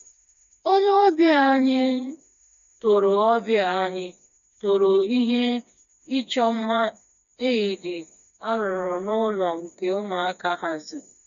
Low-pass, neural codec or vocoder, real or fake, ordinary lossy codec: 7.2 kHz; codec, 16 kHz, 2 kbps, FreqCodec, smaller model; fake; none